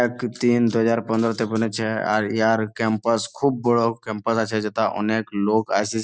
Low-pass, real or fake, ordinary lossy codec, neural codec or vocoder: none; real; none; none